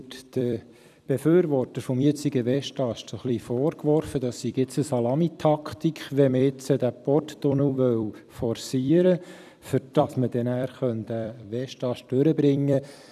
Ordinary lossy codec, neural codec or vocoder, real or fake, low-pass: none; vocoder, 44.1 kHz, 128 mel bands every 256 samples, BigVGAN v2; fake; 14.4 kHz